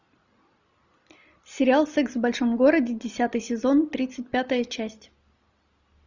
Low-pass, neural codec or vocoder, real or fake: 7.2 kHz; none; real